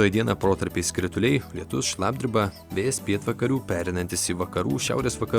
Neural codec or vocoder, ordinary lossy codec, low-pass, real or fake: none; Opus, 64 kbps; 19.8 kHz; real